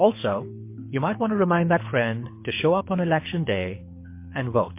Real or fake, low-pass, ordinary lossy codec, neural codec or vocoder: fake; 3.6 kHz; MP3, 24 kbps; codec, 24 kHz, 6 kbps, HILCodec